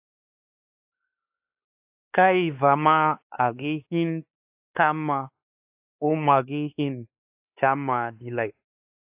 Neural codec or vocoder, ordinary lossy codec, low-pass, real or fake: codec, 16 kHz, 2 kbps, X-Codec, WavLM features, trained on Multilingual LibriSpeech; AAC, 32 kbps; 3.6 kHz; fake